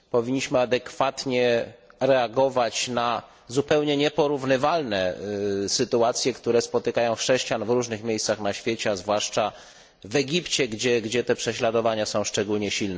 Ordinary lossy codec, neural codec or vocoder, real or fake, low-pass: none; none; real; none